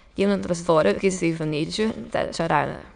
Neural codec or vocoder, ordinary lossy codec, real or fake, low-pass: autoencoder, 22.05 kHz, a latent of 192 numbers a frame, VITS, trained on many speakers; none; fake; 9.9 kHz